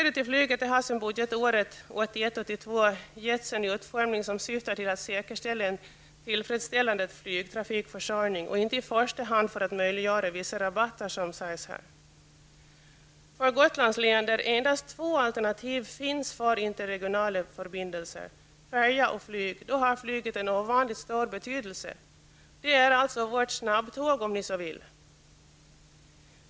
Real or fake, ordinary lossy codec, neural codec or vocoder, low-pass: real; none; none; none